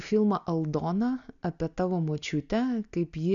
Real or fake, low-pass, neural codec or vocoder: real; 7.2 kHz; none